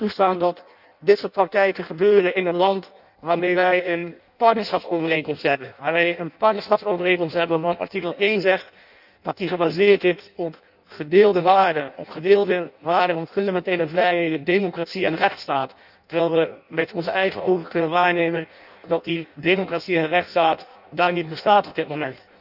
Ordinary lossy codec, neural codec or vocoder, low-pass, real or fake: none; codec, 16 kHz in and 24 kHz out, 0.6 kbps, FireRedTTS-2 codec; 5.4 kHz; fake